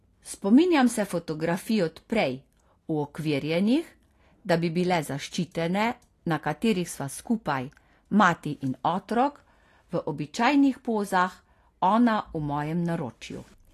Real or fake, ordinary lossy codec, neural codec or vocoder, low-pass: real; AAC, 48 kbps; none; 14.4 kHz